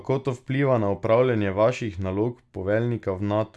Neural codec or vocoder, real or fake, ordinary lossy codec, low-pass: none; real; none; none